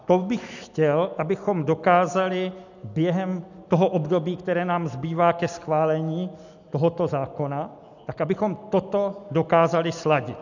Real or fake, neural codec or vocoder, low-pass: real; none; 7.2 kHz